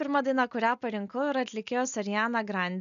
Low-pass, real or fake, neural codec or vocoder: 7.2 kHz; real; none